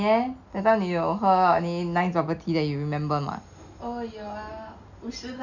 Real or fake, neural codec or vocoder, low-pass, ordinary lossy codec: real; none; 7.2 kHz; none